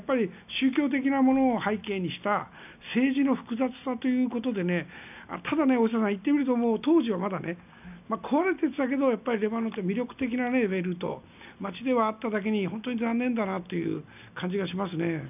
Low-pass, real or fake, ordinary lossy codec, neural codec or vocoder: 3.6 kHz; real; none; none